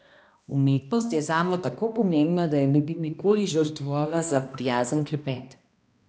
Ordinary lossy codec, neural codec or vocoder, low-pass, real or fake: none; codec, 16 kHz, 1 kbps, X-Codec, HuBERT features, trained on balanced general audio; none; fake